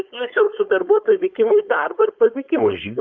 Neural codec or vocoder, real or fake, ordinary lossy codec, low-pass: codec, 16 kHz, 4.8 kbps, FACodec; fake; Opus, 64 kbps; 7.2 kHz